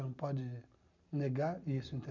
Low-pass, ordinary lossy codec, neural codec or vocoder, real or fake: 7.2 kHz; none; none; real